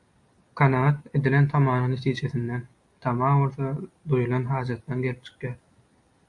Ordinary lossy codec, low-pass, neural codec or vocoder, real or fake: AAC, 64 kbps; 10.8 kHz; none; real